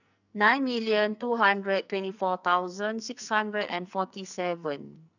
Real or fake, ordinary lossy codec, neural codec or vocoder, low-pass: fake; none; codec, 44.1 kHz, 2.6 kbps, SNAC; 7.2 kHz